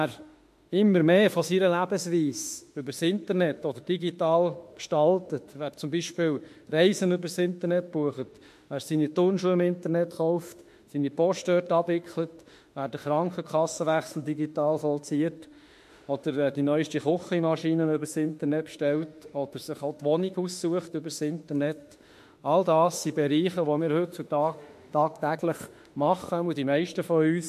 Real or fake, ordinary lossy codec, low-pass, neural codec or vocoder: fake; MP3, 64 kbps; 14.4 kHz; autoencoder, 48 kHz, 32 numbers a frame, DAC-VAE, trained on Japanese speech